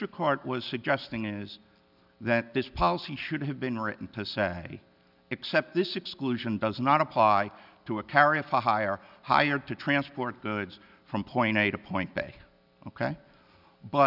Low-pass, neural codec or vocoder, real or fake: 5.4 kHz; none; real